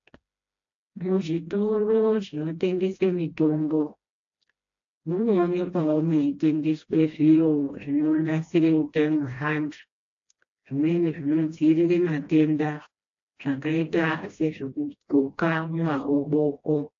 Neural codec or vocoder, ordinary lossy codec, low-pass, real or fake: codec, 16 kHz, 1 kbps, FreqCodec, smaller model; AAC, 48 kbps; 7.2 kHz; fake